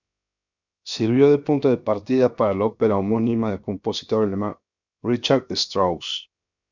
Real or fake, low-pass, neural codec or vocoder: fake; 7.2 kHz; codec, 16 kHz, 0.7 kbps, FocalCodec